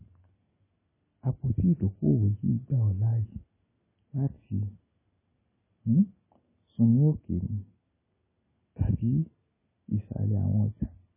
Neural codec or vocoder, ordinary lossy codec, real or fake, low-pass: none; MP3, 16 kbps; real; 3.6 kHz